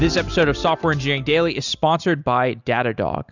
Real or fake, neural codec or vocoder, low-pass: real; none; 7.2 kHz